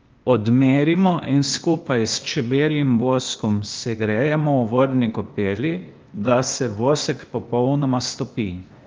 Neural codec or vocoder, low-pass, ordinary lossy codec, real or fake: codec, 16 kHz, 0.8 kbps, ZipCodec; 7.2 kHz; Opus, 24 kbps; fake